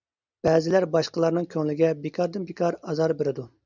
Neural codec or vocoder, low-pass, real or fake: none; 7.2 kHz; real